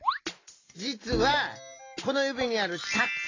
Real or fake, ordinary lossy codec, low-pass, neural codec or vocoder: real; AAC, 32 kbps; 7.2 kHz; none